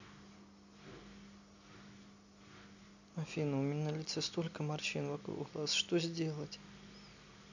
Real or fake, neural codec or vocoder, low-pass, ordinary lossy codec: real; none; 7.2 kHz; none